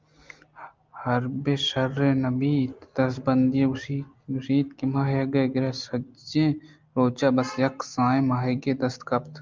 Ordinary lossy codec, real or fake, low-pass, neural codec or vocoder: Opus, 24 kbps; real; 7.2 kHz; none